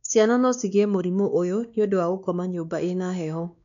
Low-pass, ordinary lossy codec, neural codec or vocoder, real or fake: 7.2 kHz; none; codec, 16 kHz, 2 kbps, X-Codec, WavLM features, trained on Multilingual LibriSpeech; fake